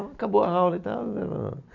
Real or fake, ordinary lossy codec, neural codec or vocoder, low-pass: real; none; none; 7.2 kHz